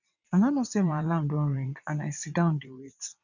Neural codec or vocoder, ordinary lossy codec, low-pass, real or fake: vocoder, 22.05 kHz, 80 mel bands, WaveNeXt; none; 7.2 kHz; fake